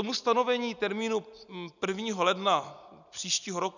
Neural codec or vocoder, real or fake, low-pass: none; real; 7.2 kHz